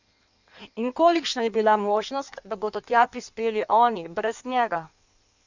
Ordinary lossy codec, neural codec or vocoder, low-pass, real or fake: none; codec, 16 kHz in and 24 kHz out, 1.1 kbps, FireRedTTS-2 codec; 7.2 kHz; fake